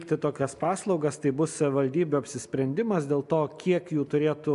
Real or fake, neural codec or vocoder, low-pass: real; none; 10.8 kHz